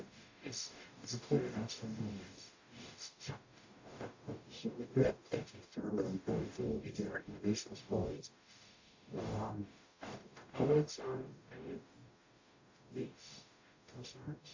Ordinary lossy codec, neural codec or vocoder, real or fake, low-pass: AAC, 48 kbps; codec, 44.1 kHz, 0.9 kbps, DAC; fake; 7.2 kHz